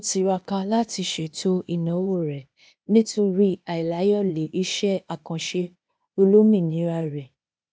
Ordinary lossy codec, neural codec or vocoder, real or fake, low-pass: none; codec, 16 kHz, 0.8 kbps, ZipCodec; fake; none